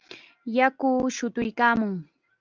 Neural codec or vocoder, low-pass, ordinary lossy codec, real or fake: none; 7.2 kHz; Opus, 24 kbps; real